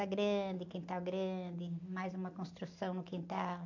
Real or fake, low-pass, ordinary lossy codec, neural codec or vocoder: real; 7.2 kHz; AAC, 48 kbps; none